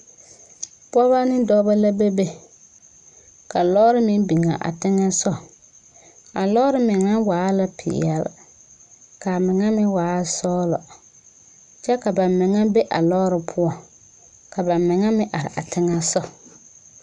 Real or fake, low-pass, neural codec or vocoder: real; 10.8 kHz; none